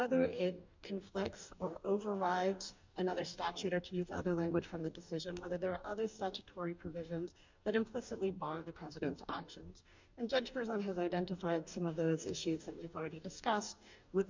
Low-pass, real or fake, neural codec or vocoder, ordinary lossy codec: 7.2 kHz; fake; codec, 44.1 kHz, 2.6 kbps, DAC; MP3, 64 kbps